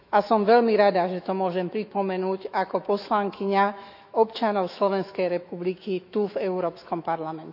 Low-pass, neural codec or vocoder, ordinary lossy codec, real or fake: 5.4 kHz; autoencoder, 48 kHz, 128 numbers a frame, DAC-VAE, trained on Japanese speech; AAC, 48 kbps; fake